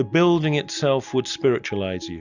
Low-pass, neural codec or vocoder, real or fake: 7.2 kHz; none; real